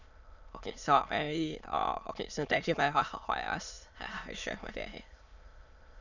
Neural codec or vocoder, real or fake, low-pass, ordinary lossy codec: autoencoder, 22.05 kHz, a latent of 192 numbers a frame, VITS, trained on many speakers; fake; 7.2 kHz; none